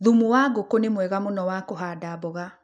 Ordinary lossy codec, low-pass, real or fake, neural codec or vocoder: none; none; real; none